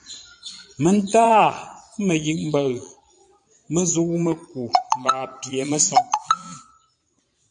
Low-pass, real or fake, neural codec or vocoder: 9.9 kHz; fake; vocoder, 22.05 kHz, 80 mel bands, Vocos